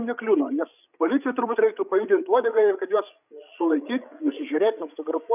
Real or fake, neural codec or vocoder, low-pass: fake; codec, 16 kHz, 8 kbps, FreqCodec, larger model; 3.6 kHz